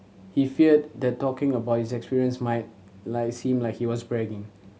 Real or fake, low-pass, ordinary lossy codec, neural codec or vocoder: real; none; none; none